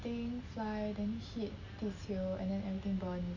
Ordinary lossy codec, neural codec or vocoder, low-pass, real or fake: none; none; 7.2 kHz; real